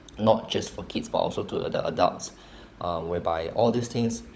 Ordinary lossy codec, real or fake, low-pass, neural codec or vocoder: none; fake; none; codec, 16 kHz, 16 kbps, FunCodec, trained on LibriTTS, 50 frames a second